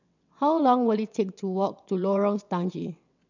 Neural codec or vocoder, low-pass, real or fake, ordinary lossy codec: vocoder, 22.05 kHz, 80 mel bands, WaveNeXt; 7.2 kHz; fake; none